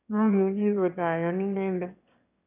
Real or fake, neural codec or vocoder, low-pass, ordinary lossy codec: fake; autoencoder, 22.05 kHz, a latent of 192 numbers a frame, VITS, trained on one speaker; 3.6 kHz; none